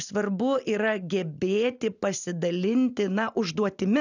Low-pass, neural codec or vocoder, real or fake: 7.2 kHz; none; real